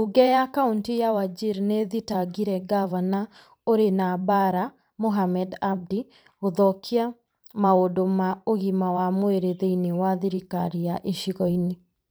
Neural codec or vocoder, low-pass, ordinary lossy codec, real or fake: vocoder, 44.1 kHz, 128 mel bands every 512 samples, BigVGAN v2; none; none; fake